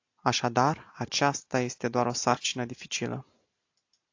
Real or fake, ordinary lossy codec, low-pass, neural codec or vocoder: real; AAC, 48 kbps; 7.2 kHz; none